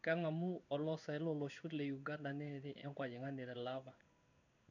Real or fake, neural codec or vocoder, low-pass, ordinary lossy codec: fake; codec, 16 kHz in and 24 kHz out, 1 kbps, XY-Tokenizer; 7.2 kHz; none